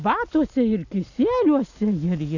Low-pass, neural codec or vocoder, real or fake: 7.2 kHz; none; real